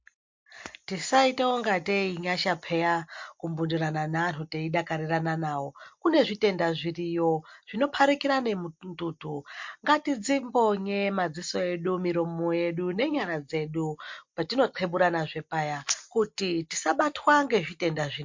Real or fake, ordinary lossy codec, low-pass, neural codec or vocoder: real; MP3, 48 kbps; 7.2 kHz; none